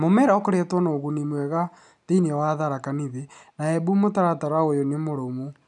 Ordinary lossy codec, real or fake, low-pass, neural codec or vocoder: none; real; 10.8 kHz; none